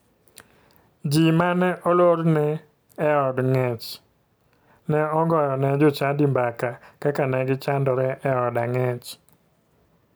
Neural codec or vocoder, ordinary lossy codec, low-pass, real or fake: none; none; none; real